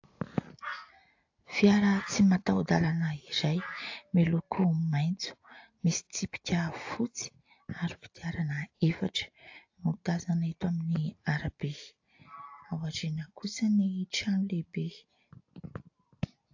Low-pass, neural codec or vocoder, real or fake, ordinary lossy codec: 7.2 kHz; none; real; AAC, 48 kbps